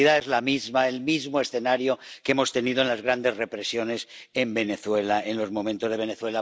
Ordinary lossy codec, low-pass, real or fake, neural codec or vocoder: none; none; real; none